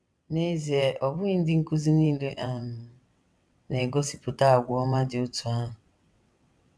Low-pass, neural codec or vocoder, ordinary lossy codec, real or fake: none; vocoder, 22.05 kHz, 80 mel bands, WaveNeXt; none; fake